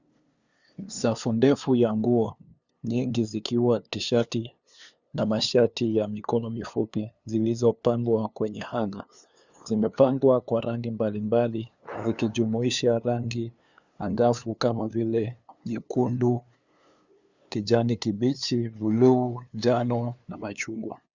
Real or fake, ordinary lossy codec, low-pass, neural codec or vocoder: fake; Opus, 64 kbps; 7.2 kHz; codec, 16 kHz, 2 kbps, FunCodec, trained on LibriTTS, 25 frames a second